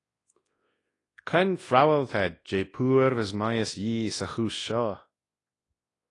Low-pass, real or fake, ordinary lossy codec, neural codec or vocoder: 10.8 kHz; fake; AAC, 32 kbps; codec, 24 kHz, 0.9 kbps, WavTokenizer, large speech release